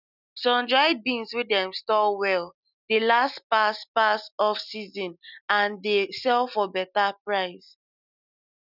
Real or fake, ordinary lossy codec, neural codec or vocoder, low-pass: real; none; none; 5.4 kHz